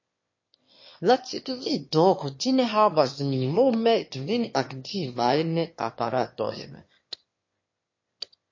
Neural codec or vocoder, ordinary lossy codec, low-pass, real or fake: autoencoder, 22.05 kHz, a latent of 192 numbers a frame, VITS, trained on one speaker; MP3, 32 kbps; 7.2 kHz; fake